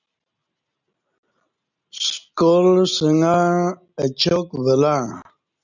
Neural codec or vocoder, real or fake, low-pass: none; real; 7.2 kHz